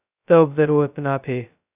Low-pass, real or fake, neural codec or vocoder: 3.6 kHz; fake; codec, 16 kHz, 0.2 kbps, FocalCodec